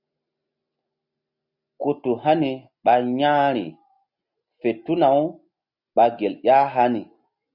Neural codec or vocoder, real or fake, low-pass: none; real; 5.4 kHz